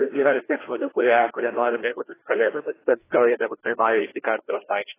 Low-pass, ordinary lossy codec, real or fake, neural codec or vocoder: 3.6 kHz; AAC, 16 kbps; fake; codec, 16 kHz, 1 kbps, FreqCodec, larger model